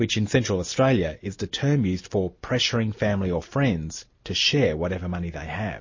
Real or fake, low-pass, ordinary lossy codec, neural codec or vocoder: real; 7.2 kHz; MP3, 32 kbps; none